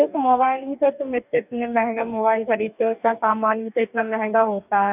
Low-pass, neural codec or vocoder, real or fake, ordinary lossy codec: 3.6 kHz; codec, 44.1 kHz, 2.6 kbps, DAC; fake; none